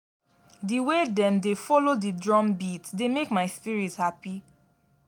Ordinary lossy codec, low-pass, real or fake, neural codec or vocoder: none; 19.8 kHz; real; none